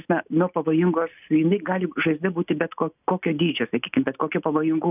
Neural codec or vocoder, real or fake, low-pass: none; real; 3.6 kHz